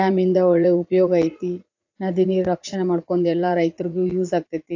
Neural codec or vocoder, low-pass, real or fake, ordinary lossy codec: vocoder, 44.1 kHz, 80 mel bands, Vocos; 7.2 kHz; fake; AAC, 48 kbps